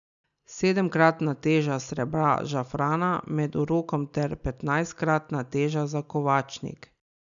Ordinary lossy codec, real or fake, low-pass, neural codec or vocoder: none; real; 7.2 kHz; none